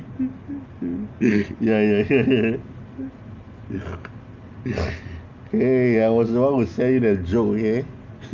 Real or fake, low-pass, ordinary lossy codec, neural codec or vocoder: real; 7.2 kHz; Opus, 24 kbps; none